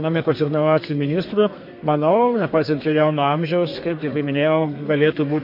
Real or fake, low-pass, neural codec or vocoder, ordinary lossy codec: fake; 5.4 kHz; codec, 32 kHz, 1.9 kbps, SNAC; MP3, 32 kbps